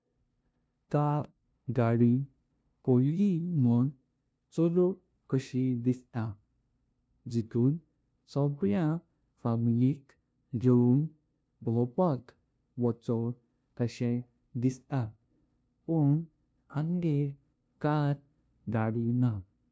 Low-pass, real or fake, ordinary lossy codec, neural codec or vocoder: none; fake; none; codec, 16 kHz, 0.5 kbps, FunCodec, trained on LibriTTS, 25 frames a second